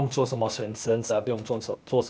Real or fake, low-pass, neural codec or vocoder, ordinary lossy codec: fake; none; codec, 16 kHz, 0.8 kbps, ZipCodec; none